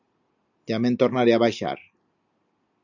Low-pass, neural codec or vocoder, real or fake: 7.2 kHz; none; real